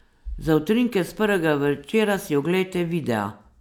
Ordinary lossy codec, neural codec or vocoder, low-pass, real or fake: none; none; 19.8 kHz; real